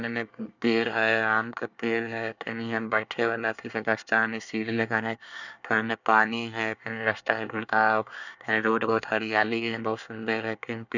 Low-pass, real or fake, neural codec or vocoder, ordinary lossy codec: 7.2 kHz; fake; codec, 24 kHz, 1 kbps, SNAC; none